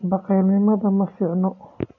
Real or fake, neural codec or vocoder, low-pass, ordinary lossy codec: real; none; 7.2 kHz; none